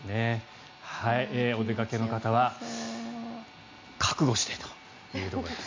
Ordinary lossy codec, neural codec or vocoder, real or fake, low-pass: MP3, 48 kbps; none; real; 7.2 kHz